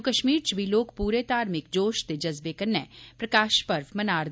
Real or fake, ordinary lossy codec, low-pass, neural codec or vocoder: real; none; none; none